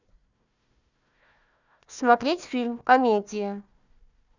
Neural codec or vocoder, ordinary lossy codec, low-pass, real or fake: codec, 16 kHz, 1 kbps, FunCodec, trained on Chinese and English, 50 frames a second; none; 7.2 kHz; fake